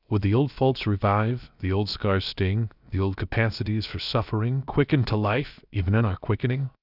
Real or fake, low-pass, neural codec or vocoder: fake; 5.4 kHz; codec, 16 kHz, 0.7 kbps, FocalCodec